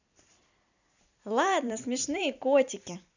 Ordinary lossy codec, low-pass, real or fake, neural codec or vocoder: none; 7.2 kHz; fake; vocoder, 44.1 kHz, 128 mel bands every 256 samples, BigVGAN v2